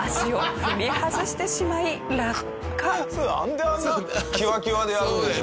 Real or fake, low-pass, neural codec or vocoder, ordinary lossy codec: real; none; none; none